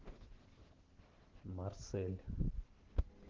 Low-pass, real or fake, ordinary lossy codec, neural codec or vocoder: 7.2 kHz; real; Opus, 16 kbps; none